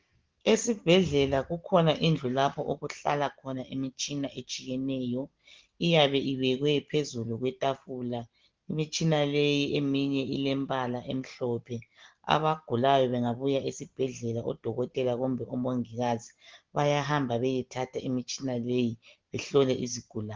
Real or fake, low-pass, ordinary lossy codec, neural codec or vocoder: real; 7.2 kHz; Opus, 16 kbps; none